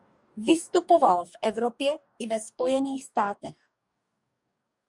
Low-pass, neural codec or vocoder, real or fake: 10.8 kHz; codec, 44.1 kHz, 2.6 kbps, DAC; fake